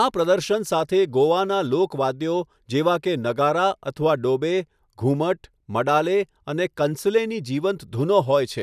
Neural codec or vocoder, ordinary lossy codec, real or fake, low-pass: none; none; real; 14.4 kHz